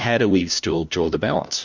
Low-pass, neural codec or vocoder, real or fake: 7.2 kHz; codec, 16 kHz, 1 kbps, FunCodec, trained on LibriTTS, 50 frames a second; fake